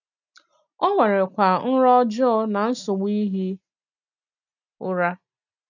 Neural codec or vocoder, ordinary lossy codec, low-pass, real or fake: none; none; 7.2 kHz; real